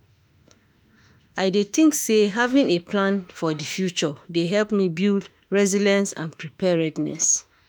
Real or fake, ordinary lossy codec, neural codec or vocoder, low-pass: fake; none; autoencoder, 48 kHz, 32 numbers a frame, DAC-VAE, trained on Japanese speech; none